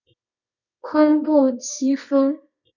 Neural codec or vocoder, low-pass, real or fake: codec, 24 kHz, 0.9 kbps, WavTokenizer, medium music audio release; 7.2 kHz; fake